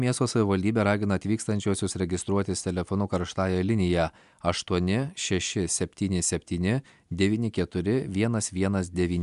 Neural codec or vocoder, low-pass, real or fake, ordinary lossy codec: none; 10.8 kHz; real; MP3, 96 kbps